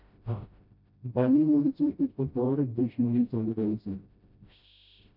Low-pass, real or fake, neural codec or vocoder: 5.4 kHz; fake; codec, 16 kHz, 0.5 kbps, FreqCodec, smaller model